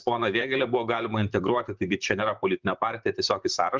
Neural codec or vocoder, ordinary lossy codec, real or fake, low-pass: vocoder, 44.1 kHz, 128 mel bands, Pupu-Vocoder; Opus, 32 kbps; fake; 7.2 kHz